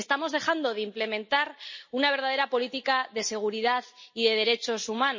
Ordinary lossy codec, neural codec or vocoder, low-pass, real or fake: MP3, 32 kbps; none; 7.2 kHz; real